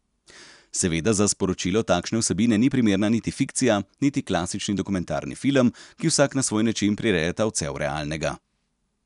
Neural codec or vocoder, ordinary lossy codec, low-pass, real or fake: none; none; 10.8 kHz; real